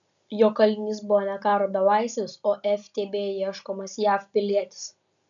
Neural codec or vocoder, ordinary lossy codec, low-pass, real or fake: none; MP3, 96 kbps; 7.2 kHz; real